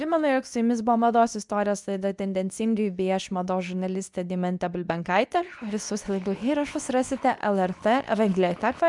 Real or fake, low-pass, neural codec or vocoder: fake; 10.8 kHz; codec, 24 kHz, 0.9 kbps, WavTokenizer, medium speech release version 2